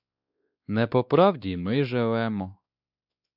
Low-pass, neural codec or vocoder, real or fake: 5.4 kHz; codec, 16 kHz, 2 kbps, X-Codec, WavLM features, trained on Multilingual LibriSpeech; fake